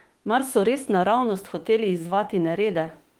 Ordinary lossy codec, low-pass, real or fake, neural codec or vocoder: Opus, 24 kbps; 19.8 kHz; fake; autoencoder, 48 kHz, 32 numbers a frame, DAC-VAE, trained on Japanese speech